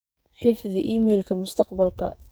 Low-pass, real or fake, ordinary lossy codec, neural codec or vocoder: none; fake; none; codec, 44.1 kHz, 2.6 kbps, SNAC